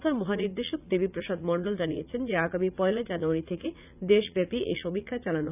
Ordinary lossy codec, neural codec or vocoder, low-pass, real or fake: none; vocoder, 44.1 kHz, 80 mel bands, Vocos; 3.6 kHz; fake